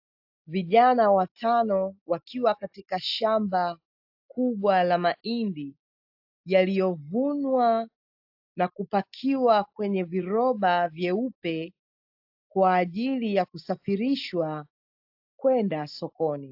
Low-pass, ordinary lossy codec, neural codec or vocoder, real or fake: 5.4 kHz; AAC, 48 kbps; none; real